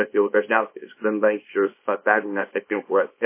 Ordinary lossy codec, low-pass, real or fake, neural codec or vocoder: MP3, 24 kbps; 3.6 kHz; fake; codec, 24 kHz, 0.9 kbps, WavTokenizer, small release